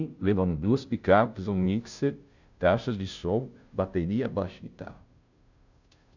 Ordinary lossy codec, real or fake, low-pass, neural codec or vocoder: none; fake; 7.2 kHz; codec, 16 kHz, 0.5 kbps, FunCodec, trained on Chinese and English, 25 frames a second